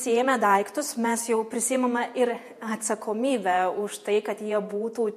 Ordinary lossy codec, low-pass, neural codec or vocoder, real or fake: MP3, 64 kbps; 14.4 kHz; vocoder, 48 kHz, 128 mel bands, Vocos; fake